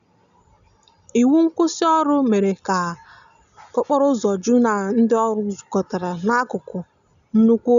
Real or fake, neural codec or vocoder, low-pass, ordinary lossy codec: real; none; 7.2 kHz; none